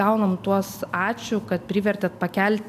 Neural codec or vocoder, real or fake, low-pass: none; real; 14.4 kHz